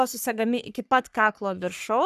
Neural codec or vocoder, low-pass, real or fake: codec, 44.1 kHz, 3.4 kbps, Pupu-Codec; 14.4 kHz; fake